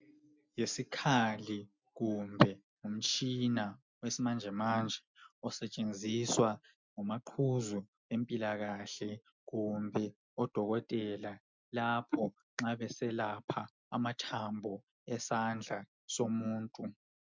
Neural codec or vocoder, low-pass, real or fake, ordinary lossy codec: none; 7.2 kHz; real; MP3, 64 kbps